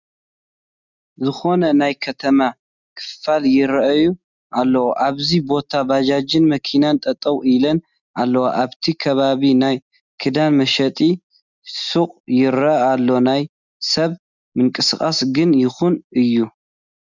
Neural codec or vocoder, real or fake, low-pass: none; real; 7.2 kHz